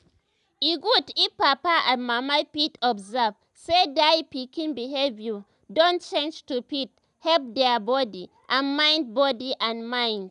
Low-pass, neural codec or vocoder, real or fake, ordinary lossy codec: 10.8 kHz; none; real; none